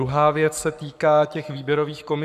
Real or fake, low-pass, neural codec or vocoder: fake; 14.4 kHz; codec, 44.1 kHz, 7.8 kbps, Pupu-Codec